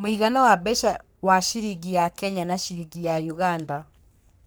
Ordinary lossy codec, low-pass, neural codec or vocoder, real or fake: none; none; codec, 44.1 kHz, 3.4 kbps, Pupu-Codec; fake